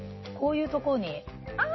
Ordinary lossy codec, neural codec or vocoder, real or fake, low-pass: MP3, 24 kbps; none; real; 7.2 kHz